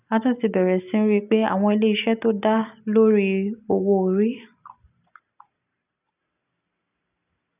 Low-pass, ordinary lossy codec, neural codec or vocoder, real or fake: 3.6 kHz; none; none; real